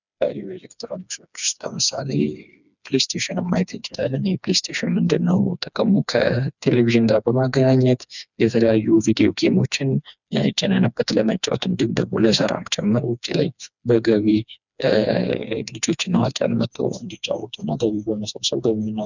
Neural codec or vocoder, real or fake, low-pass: codec, 16 kHz, 2 kbps, FreqCodec, smaller model; fake; 7.2 kHz